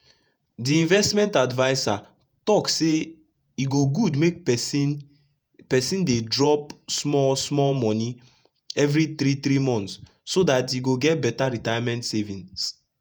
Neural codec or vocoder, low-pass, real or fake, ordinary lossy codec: vocoder, 48 kHz, 128 mel bands, Vocos; none; fake; none